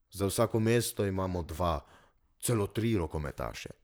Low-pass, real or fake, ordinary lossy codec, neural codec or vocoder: none; fake; none; vocoder, 44.1 kHz, 128 mel bands, Pupu-Vocoder